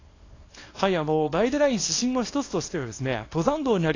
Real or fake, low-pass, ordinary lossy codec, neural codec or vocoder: fake; 7.2 kHz; AAC, 32 kbps; codec, 24 kHz, 0.9 kbps, WavTokenizer, small release